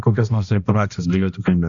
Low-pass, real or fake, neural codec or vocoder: 7.2 kHz; fake; codec, 16 kHz, 1 kbps, X-Codec, HuBERT features, trained on balanced general audio